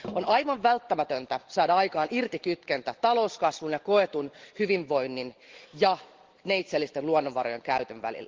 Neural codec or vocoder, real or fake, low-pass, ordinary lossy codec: none; real; 7.2 kHz; Opus, 16 kbps